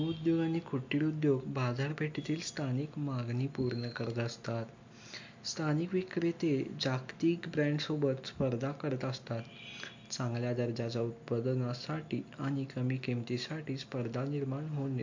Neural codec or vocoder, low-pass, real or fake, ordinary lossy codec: none; 7.2 kHz; real; MP3, 48 kbps